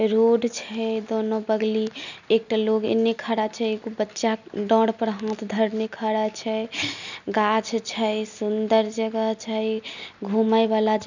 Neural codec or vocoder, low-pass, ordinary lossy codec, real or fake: none; 7.2 kHz; none; real